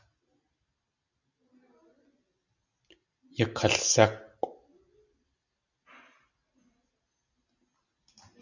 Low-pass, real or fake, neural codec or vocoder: 7.2 kHz; real; none